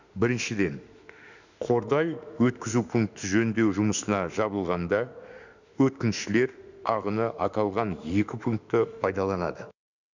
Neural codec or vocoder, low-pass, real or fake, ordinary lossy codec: autoencoder, 48 kHz, 32 numbers a frame, DAC-VAE, trained on Japanese speech; 7.2 kHz; fake; none